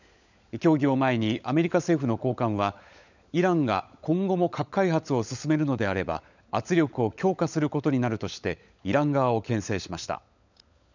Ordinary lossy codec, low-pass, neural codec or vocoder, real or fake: none; 7.2 kHz; codec, 16 kHz, 16 kbps, FunCodec, trained on LibriTTS, 50 frames a second; fake